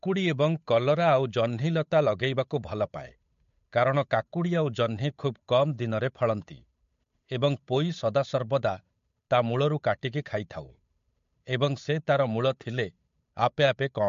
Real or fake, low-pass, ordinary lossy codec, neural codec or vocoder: fake; 7.2 kHz; MP3, 48 kbps; codec, 16 kHz, 8 kbps, FunCodec, trained on Chinese and English, 25 frames a second